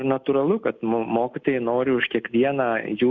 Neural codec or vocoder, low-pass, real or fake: none; 7.2 kHz; real